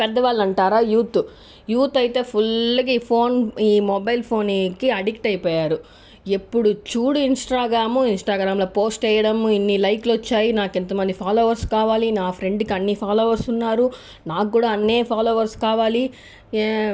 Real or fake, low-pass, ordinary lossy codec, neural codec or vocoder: real; none; none; none